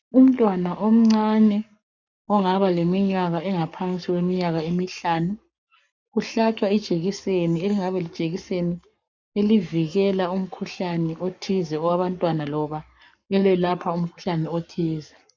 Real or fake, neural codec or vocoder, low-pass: fake; codec, 44.1 kHz, 7.8 kbps, Pupu-Codec; 7.2 kHz